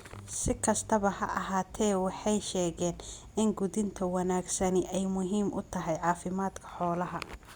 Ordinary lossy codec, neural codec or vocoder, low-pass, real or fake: Opus, 64 kbps; none; 19.8 kHz; real